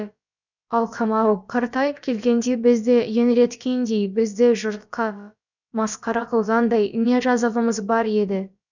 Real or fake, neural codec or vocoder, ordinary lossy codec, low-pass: fake; codec, 16 kHz, about 1 kbps, DyCAST, with the encoder's durations; none; 7.2 kHz